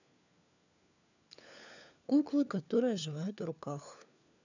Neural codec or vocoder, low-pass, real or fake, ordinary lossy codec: codec, 16 kHz, 4 kbps, FunCodec, trained on LibriTTS, 50 frames a second; 7.2 kHz; fake; none